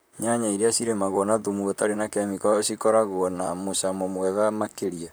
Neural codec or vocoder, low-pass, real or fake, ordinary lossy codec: vocoder, 44.1 kHz, 128 mel bands, Pupu-Vocoder; none; fake; none